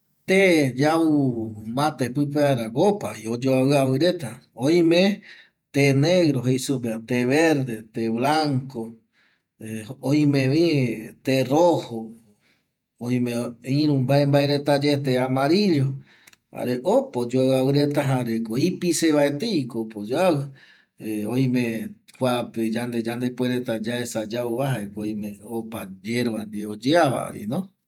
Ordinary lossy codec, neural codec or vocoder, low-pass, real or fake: none; vocoder, 48 kHz, 128 mel bands, Vocos; 19.8 kHz; fake